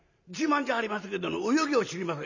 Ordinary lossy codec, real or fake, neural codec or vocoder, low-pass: none; real; none; 7.2 kHz